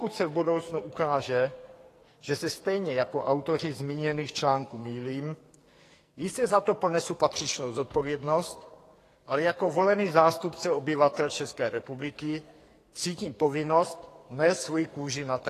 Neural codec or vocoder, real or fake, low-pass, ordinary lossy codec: codec, 44.1 kHz, 2.6 kbps, SNAC; fake; 14.4 kHz; AAC, 48 kbps